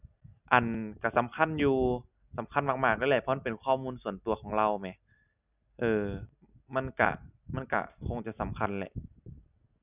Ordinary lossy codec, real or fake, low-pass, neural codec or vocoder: none; real; 3.6 kHz; none